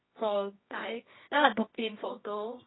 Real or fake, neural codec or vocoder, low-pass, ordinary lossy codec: fake; codec, 24 kHz, 0.9 kbps, WavTokenizer, medium music audio release; 7.2 kHz; AAC, 16 kbps